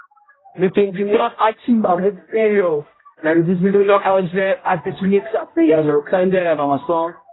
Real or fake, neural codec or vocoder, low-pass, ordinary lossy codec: fake; codec, 16 kHz, 0.5 kbps, X-Codec, HuBERT features, trained on general audio; 7.2 kHz; AAC, 16 kbps